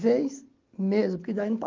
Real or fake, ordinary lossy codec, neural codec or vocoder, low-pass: real; Opus, 32 kbps; none; 7.2 kHz